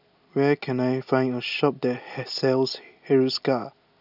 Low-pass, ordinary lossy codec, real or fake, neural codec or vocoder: 5.4 kHz; none; real; none